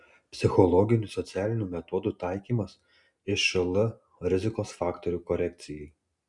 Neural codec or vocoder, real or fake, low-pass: none; real; 10.8 kHz